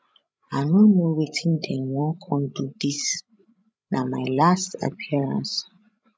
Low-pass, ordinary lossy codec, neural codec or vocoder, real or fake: none; none; codec, 16 kHz, 16 kbps, FreqCodec, larger model; fake